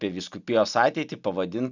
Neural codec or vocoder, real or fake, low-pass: none; real; 7.2 kHz